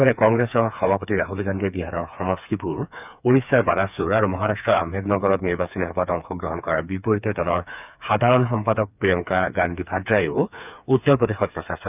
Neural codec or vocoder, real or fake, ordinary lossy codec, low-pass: codec, 16 kHz, 4 kbps, FreqCodec, smaller model; fake; none; 3.6 kHz